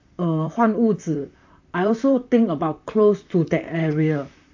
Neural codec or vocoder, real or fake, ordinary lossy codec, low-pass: vocoder, 44.1 kHz, 128 mel bands, Pupu-Vocoder; fake; AAC, 48 kbps; 7.2 kHz